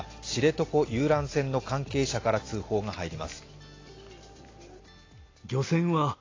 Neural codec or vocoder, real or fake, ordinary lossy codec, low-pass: none; real; AAC, 32 kbps; 7.2 kHz